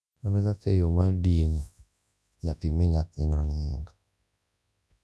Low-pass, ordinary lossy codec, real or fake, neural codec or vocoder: none; none; fake; codec, 24 kHz, 0.9 kbps, WavTokenizer, large speech release